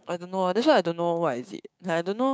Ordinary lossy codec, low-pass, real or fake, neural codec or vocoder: none; none; fake; codec, 16 kHz, 6 kbps, DAC